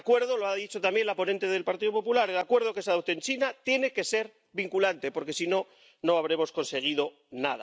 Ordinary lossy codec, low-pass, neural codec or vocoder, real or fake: none; none; none; real